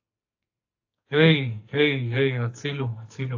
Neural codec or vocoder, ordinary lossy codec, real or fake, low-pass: codec, 44.1 kHz, 2.6 kbps, SNAC; AAC, 48 kbps; fake; 7.2 kHz